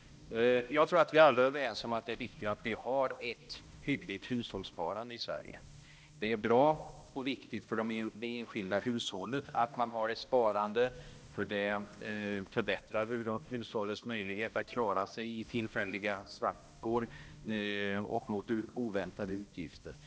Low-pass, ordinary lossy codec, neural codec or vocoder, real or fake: none; none; codec, 16 kHz, 1 kbps, X-Codec, HuBERT features, trained on balanced general audio; fake